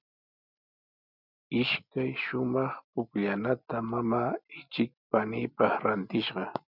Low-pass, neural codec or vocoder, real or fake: 5.4 kHz; none; real